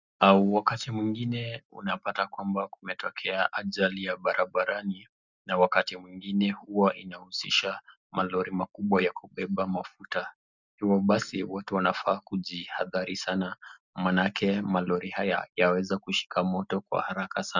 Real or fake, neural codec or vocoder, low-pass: real; none; 7.2 kHz